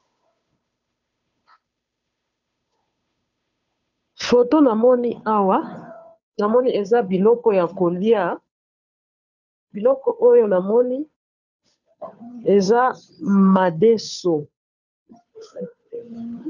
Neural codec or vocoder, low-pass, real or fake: codec, 16 kHz, 2 kbps, FunCodec, trained on Chinese and English, 25 frames a second; 7.2 kHz; fake